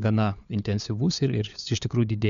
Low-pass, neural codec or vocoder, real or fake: 7.2 kHz; codec, 16 kHz, 4 kbps, FunCodec, trained on LibriTTS, 50 frames a second; fake